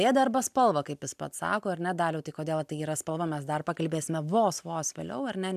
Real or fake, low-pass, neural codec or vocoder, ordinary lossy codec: real; 14.4 kHz; none; AAC, 96 kbps